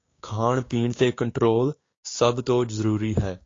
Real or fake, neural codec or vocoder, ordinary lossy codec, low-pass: fake; codec, 16 kHz, 6 kbps, DAC; AAC, 32 kbps; 7.2 kHz